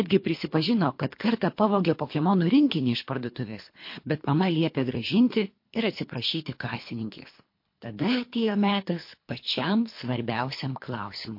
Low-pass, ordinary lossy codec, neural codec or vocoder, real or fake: 5.4 kHz; MP3, 32 kbps; codec, 24 kHz, 3 kbps, HILCodec; fake